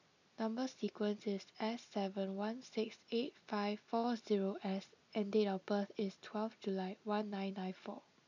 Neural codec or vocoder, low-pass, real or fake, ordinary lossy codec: none; 7.2 kHz; real; none